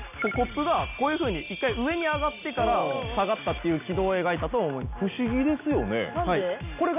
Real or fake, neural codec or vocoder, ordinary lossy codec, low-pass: real; none; none; 3.6 kHz